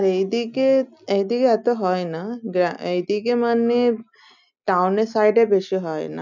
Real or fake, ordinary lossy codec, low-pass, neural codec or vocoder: real; none; 7.2 kHz; none